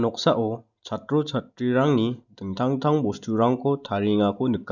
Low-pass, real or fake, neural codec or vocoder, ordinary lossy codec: 7.2 kHz; real; none; none